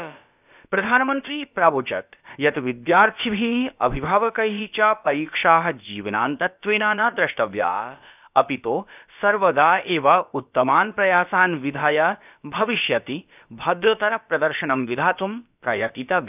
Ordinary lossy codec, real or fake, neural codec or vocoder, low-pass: none; fake; codec, 16 kHz, about 1 kbps, DyCAST, with the encoder's durations; 3.6 kHz